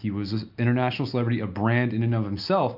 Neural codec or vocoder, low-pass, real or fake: none; 5.4 kHz; real